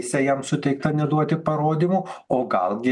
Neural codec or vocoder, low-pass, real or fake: none; 10.8 kHz; real